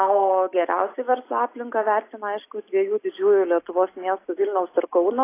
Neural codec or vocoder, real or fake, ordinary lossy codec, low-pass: none; real; AAC, 24 kbps; 3.6 kHz